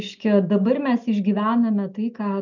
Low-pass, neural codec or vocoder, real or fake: 7.2 kHz; none; real